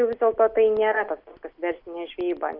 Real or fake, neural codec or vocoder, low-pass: real; none; 5.4 kHz